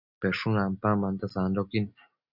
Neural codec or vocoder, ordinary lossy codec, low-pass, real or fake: none; Opus, 64 kbps; 5.4 kHz; real